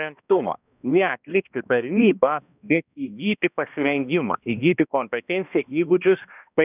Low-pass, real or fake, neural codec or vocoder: 3.6 kHz; fake; codec, 16 kHz, 1 kbps, X-Codec, HuBERT features, trained on balanced general audio